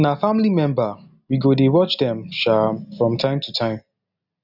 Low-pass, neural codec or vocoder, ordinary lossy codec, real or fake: 5.4 kHz; none; none; real